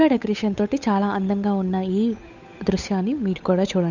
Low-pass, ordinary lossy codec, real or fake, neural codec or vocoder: 7.2 kHz; none; fake; codec, 16 kHz, 8 kbps, FunCodec, trained on Chinese and English, 25 frames a second